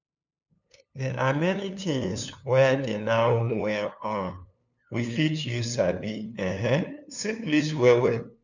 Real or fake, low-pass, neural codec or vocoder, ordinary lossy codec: fake; 7.2 kHz; codec, 16 kHz, 2 kbps, FunCodec, trained on LibriTTS, 25 frames a second; none